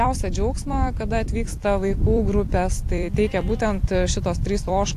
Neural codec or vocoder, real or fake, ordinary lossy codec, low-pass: vocoder, 44.1 kHz, 128 mel bands every 256 samples, BigVGAN v2; fake; AAC, 64 kbps; 14.4 kHz